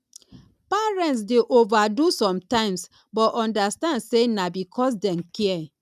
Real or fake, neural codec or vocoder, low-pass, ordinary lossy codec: real; none; 14.4 kHz; none